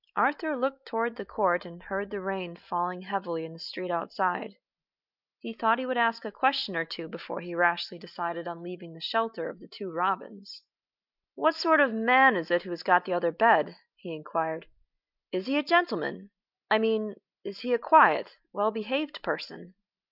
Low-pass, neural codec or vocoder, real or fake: 5.4 kHz; none; real